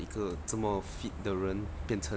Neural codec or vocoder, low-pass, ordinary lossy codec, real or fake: none; none; none; real